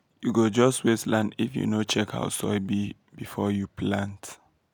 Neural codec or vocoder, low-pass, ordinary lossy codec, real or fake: none; none; none; real